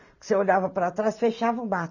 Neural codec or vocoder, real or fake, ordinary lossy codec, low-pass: none; real; none; 7.2 kHz